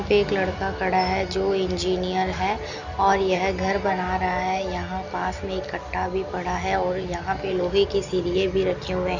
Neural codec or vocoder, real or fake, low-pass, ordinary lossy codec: none; real; 7.2 kHz; none